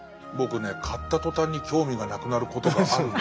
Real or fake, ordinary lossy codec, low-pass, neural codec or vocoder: real; none; none; none